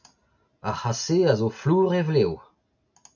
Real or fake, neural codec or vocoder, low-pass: real; none; 7.2 kHz